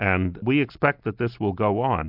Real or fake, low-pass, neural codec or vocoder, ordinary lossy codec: real; 5.4 kHz; none; AAC, 48 kbps